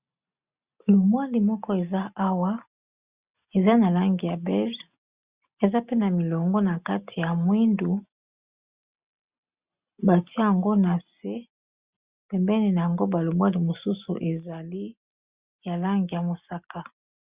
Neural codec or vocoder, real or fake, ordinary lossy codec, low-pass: none; real; Opus, 64 kbps; 3.6 kHz